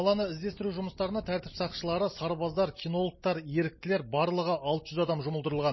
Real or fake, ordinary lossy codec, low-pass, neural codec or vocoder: real; MP3, 24 kbps; 7.2 kHz; none